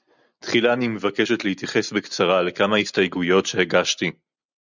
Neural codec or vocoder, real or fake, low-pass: none; real; 7.2 kHz